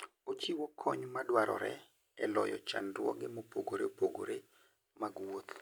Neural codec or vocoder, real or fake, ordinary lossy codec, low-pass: none; real; none; none